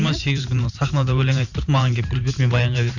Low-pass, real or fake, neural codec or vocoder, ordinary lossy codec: 7.2 kHz; fake; vocoder, 44.1 kHz, 128 mel bands every 512 samples, BigVGAN v2; none